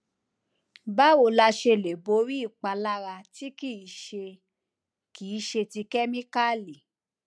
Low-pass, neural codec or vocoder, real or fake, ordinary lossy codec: none; none; real; none